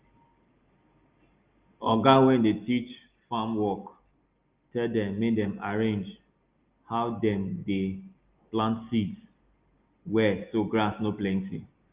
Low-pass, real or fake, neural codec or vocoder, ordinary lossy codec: 3.6 kHz; real; none; Opus, 32 kbps